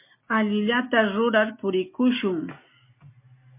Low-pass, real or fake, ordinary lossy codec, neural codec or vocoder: 3.6 kHz; real; MP3, 24 kbps; none